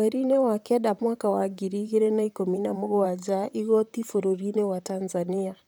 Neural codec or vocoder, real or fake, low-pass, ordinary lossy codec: vocoder, 44.1 kHz, 128 mel bands, Pupu-Vocoder; fake; none; none